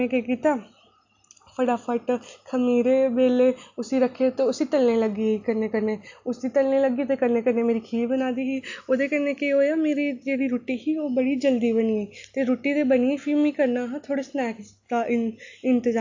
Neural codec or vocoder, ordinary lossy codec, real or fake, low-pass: none; AAC, 48 kbps; real; 7.2 kHz